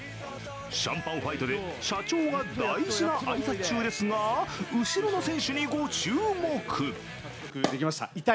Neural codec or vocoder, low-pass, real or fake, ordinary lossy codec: none; none; real; none